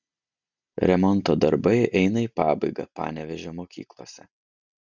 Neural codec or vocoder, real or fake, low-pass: none; real; 7.2 kHz